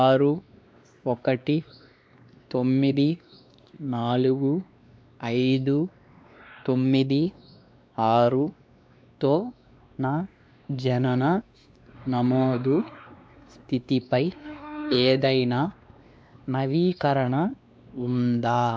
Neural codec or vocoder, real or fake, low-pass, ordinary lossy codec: codec, 16 kHz, 2 kbps, X-Codec, WavLM features, trained on Multilingual LibriSpeech; fake; none; none